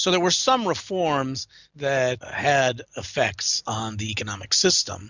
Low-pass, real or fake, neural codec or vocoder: 7.2 kHz; real; none